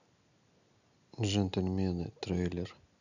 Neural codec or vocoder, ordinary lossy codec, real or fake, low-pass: none; none; real; 7.2 kHz